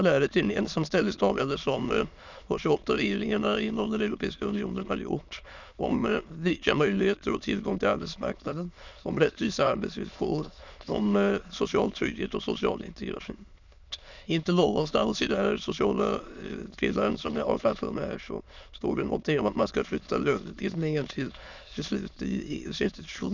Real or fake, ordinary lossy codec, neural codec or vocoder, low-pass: fake; none; autoencoder, 22.05 kHz, a latent of 192 numbers a frame, VITS, trained on many speakers; 7.2 kHz